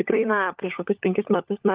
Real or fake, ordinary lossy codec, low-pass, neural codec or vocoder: fake; Opus, 24 kbps; 3.6 kHz; codec, 16 kHz, 16 kbps, FunCodec, trained on LibriTTS, 50 frames a second